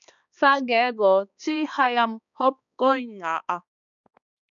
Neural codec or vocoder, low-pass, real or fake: codec, 16 kHz, 2 kbps, X-Codec, HuBERT features, trained on balanced general audio; 7.2 kHz; fake